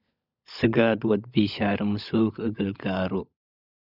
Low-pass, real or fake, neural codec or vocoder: 5.4 kHz; fake; codec, 16 kHz, 16 kbps, FunCodec, trained on LibriTTS, 50 frames a second